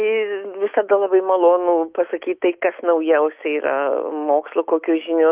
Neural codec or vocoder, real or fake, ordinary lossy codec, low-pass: autoencoder, 48 kHz, 128 numbers a frame, DAC-VAE, trained on Japanese speech; fake; Opus, 32 kbps; 3.6 kHz